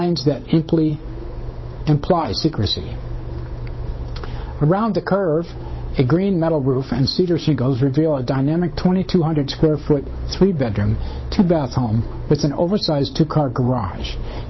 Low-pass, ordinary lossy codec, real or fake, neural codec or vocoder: 7.2 kHz; MP3, 24 kbps; fake; codec, 16 kHz, 8 kbps, FunCodec, trained on Chinese and English, 25 frames a second